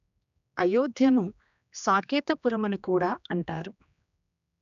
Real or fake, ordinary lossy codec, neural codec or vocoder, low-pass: fake; none; codec, 16 kHz, 2 kbps, X-Codec, HuBERT features, trained on general audio; 7.2 kHz